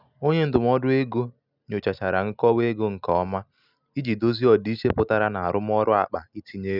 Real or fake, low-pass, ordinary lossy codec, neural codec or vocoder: real; 5.4 kHz; none; none